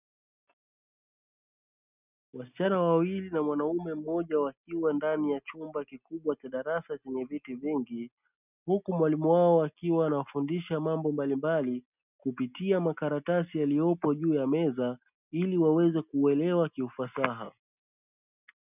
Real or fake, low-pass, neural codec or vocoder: real; 3.6 kHz; none